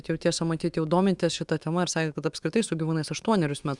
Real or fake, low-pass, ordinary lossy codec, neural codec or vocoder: fake; 10.8 kHz; Opus, 64 kbps; autoencoder, 48 kHz, 128 numbers a frame, DAC-VAE, trained on Japanese speech